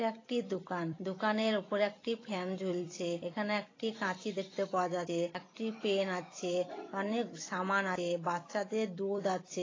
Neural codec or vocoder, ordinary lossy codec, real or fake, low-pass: vocoder, 44.1 kHz, 128 mel bands every 256 samples, BigVGAN v2; AAC, 32 kbps; fake; 7.2 kHz